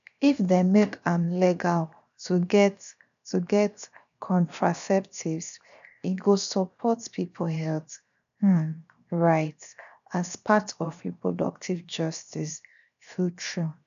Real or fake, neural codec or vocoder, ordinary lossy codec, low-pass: fake; codec, 16 kHz, 0.7 kbps, FocalCodec; none; 7.2 kHz